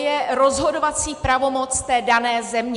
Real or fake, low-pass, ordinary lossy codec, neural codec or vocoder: real; 10.8 kHz; MP3, 64 kbps; none